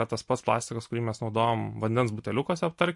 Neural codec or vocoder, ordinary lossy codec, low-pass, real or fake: none; MP3, 48 kbps; 10.8 kHz; real